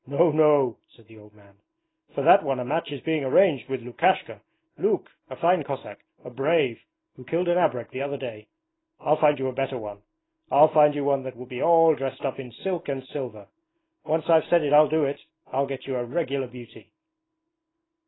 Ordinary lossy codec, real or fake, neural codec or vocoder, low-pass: AAC, 16 kbps; real; none; 7.2 kHz